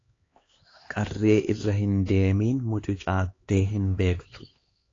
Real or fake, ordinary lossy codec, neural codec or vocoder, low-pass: fake; AAC, 32 kbps; codec, 16 kHz, 2 kbps, X-Codec, HuBERT features, trained on LibriSpeech; 7.2 kHz